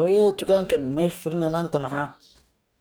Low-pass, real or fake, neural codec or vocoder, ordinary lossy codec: none; fake; codec, 44.1 kHz, 2.6 kbps, DAC; none